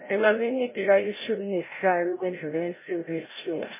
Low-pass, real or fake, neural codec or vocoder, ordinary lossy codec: 3.6 kHz; fake; codec, 16 kHz, 0.5 kbps, FreqCodec, larger model; MP3, 16 kbps